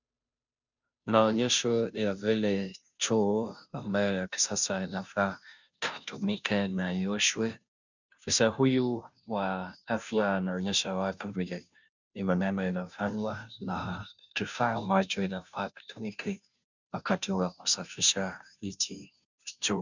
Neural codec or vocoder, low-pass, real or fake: codec, 16 kHz, 0.5 kbps, FunCodec, trained on Chinese and English, 25 frames a second; 7.2 kHz; fake